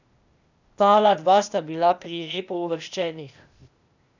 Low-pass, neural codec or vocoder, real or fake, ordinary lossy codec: 7.2 kHz; codec, 16 kHz, 0.8 kbps, ZipCodec; fake; none